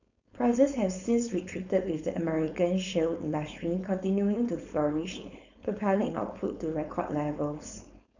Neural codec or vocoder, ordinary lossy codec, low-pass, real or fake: codec, 16 kHz, 4.8 kbps, FACodec; none; 7.2 kHz; fake